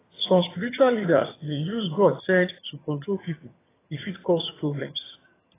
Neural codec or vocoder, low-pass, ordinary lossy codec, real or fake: vocoder, 22.05 kHz, 80 mel bands, HiFi-GAN; 3.6 kHz; AAC, 16 kbps; fake